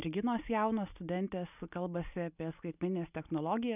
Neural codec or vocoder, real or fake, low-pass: none; real; 3.6 kHz